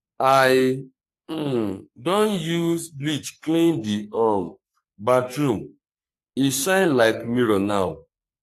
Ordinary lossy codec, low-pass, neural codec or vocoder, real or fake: AAC, 64 kbps; 14.4 kHz; codec, 44.1 kHz, 3.4 kbps, Pupu-Codec; fake